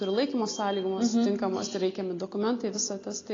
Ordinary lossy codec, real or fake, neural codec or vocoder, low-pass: AAC, 32 kbps; real; none; 7.2 kHz